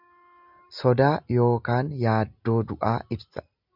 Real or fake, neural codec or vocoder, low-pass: real; none; 5.4 kHz